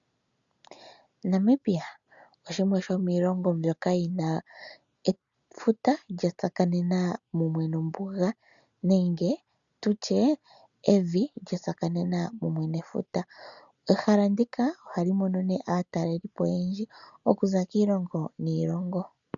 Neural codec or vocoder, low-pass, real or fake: none; 7.2 kHz; real